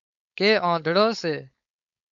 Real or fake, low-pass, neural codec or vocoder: fake; 7.2 kHz; codec, 16 kHz, 4.8 kbps, FACodec